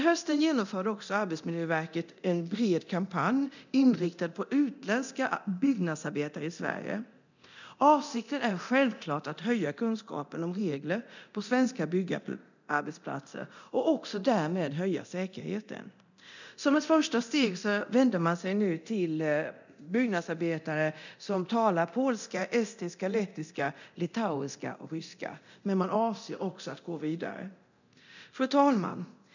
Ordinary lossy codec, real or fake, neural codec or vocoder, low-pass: none; fake; codec, 24 kHz, 0.9 kbps, DualCodec; 7.2 kHz